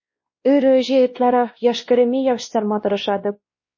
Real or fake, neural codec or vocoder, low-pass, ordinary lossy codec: fake; codec, 16 kHz, 1 kbps, X-Codec, WavLM features, trained on Multilingual LibriSpeech; 7.2 kHz; MP3, 32 kbps